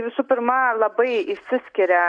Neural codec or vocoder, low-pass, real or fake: none; 9.9 kHz; real